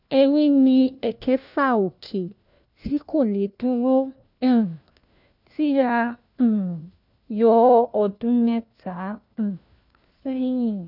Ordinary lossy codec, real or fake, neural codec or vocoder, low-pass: none; fake; codec, 16 kHz, 1 kbps, FunCodec, trained on LibriTTS, 50 frames a second; 5.4 kHz